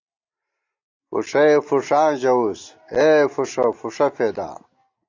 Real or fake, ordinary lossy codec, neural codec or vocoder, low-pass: real; AAC, 48 kbps; none; 7.2 kHz